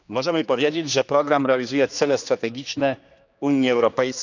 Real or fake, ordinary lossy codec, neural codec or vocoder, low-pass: fake; none; codec, 16 kHz, 2 kbps, X-Codec, HuBERT features, trained on general audio; 7.2 kHz